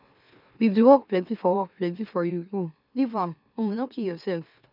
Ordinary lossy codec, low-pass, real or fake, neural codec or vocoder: none; 5.4 kHz; fake; autoencoder, 44.1 kHz, a latent of 192 numbers a frame, MeloTTS